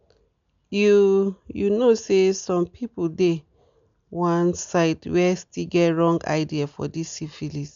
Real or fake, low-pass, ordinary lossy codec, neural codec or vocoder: real; 7.2 kHz; MP3, 64 kbps; none